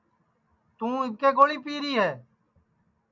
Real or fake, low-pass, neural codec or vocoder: real; 7.2 kHz; none